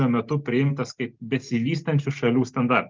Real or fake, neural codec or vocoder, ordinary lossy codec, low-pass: real; none; Opus, 24 kbps; 7.2 kHz